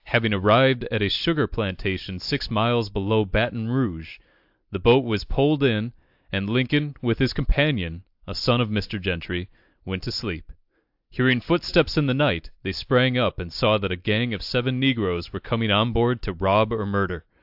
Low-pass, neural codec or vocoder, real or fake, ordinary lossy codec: 5.4 kHz; none; real; AAC, 48 kbps